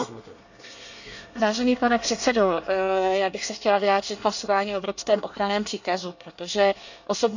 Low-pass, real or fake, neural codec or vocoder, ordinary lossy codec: 7.2 kHz; fake; codec, 24 kHz, 1 kbps, SNAC; none